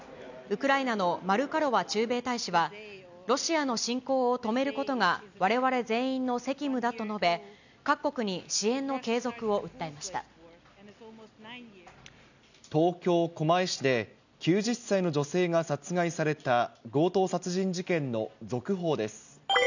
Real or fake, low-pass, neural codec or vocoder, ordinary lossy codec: real; 7.2 kHz; none; none